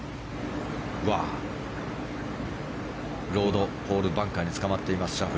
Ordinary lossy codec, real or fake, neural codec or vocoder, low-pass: none; real; none; none